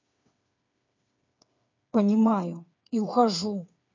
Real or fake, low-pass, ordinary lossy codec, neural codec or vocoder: fake; 7.2 kHz; MP3, 48 kbps; codec, 16 kHz, 8 kbps, FreqCodec, smaller model